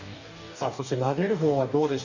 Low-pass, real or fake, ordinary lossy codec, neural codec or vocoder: 7.2 kHz; fake; none; codec, 44.1 kHz, 2.6 kbps, DAC